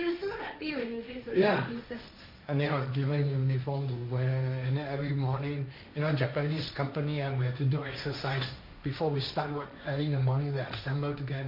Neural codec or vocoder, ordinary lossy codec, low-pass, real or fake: codec, 16 kHz, 1.1 kbps, Voila-Tokenizer; MP3, 48 kbps; 5.4 kHz; fake